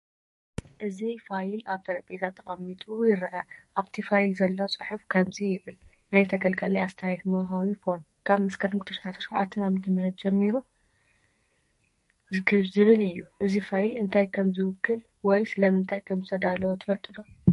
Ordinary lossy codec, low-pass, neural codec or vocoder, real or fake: MP3, 48 kbps; 14.4 kHz; codec, 44.1 kHz, 2.6 kbps, SNAC; fake